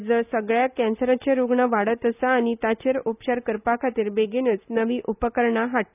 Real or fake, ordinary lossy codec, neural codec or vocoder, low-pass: real; none; none; 3.6 kHz